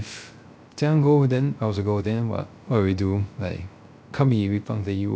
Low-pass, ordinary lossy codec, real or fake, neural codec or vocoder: none; none; fake; codec, 16 kHz, 0.3 kbps, FocalCodec